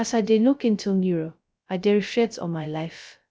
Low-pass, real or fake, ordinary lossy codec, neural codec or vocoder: none; fake; none; codec, 16 kHz, 0.2 kbps, FocalCodec